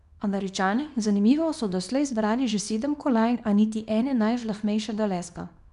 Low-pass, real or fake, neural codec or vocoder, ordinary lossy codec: 10.8 kHz; fake; codec, 24 kHz, 0.9 kbps, WavTokenizer, small release; none